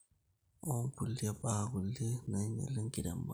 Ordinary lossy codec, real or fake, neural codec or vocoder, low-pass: none; real; none; none